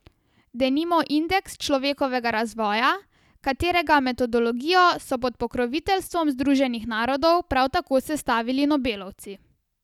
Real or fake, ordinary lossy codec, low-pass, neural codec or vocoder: real; none; 19.8 kHz; none